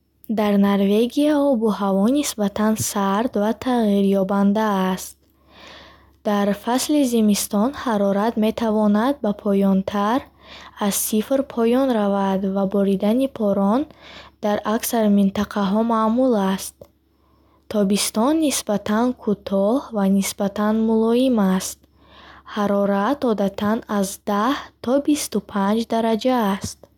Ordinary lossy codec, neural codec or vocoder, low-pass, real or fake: none; none; 19.8 kHz; real